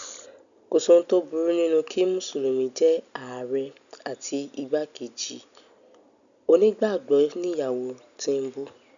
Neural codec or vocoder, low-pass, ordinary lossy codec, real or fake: none; 7.2 kHz; none; real